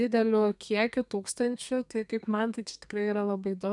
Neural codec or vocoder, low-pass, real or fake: codec, 32 kHz, 1.9 kbps, SNAC; 10.8 kHz; fake